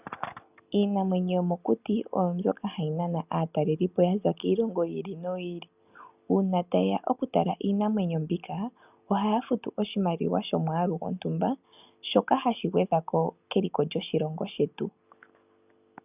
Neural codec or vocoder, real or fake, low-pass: none; real; 3.6 kHz